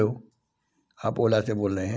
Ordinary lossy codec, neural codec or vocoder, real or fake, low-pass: none; none; real; none